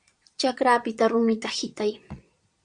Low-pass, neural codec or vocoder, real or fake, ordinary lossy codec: 9.9 kHz; vocoder, 22.05 kHz, 80 mel bands, Vocos; fake; Opus, 64 kbps